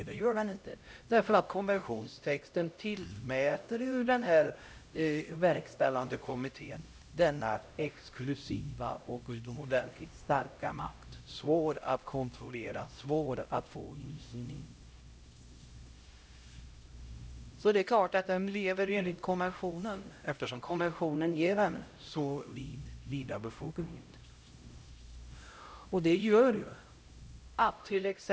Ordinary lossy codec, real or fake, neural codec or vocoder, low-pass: none; fake; codec, 16 kHz, 0.5 kbps, X-Codec, HuBERT features, trained on LibriSpeech; none